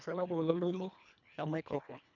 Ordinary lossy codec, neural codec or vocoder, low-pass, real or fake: none; codec, 24 kHz, 1.5 kbps, HILCodec; 7.2 kHz; fake